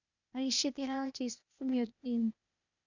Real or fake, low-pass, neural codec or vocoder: fake; 7.2 kHz; codec, 16 kHz, 0.8 kbps, ZipCodec